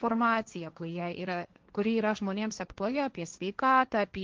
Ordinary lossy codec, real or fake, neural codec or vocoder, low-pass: Opus, 32 kbps; fake; codec, 16 kHz, 1.1 kbps, Voila-Tokenizer; 7.2 kHz